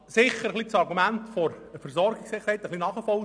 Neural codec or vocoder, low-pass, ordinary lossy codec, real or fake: none; 9.9 kHz; none; real